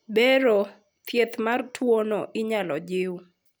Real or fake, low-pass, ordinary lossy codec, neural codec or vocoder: real; none; none; none